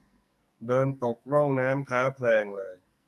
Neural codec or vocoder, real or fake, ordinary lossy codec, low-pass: codec, 44.1 kHz, 2.6 kbps, SNAC; fake; none; 14.4 kHz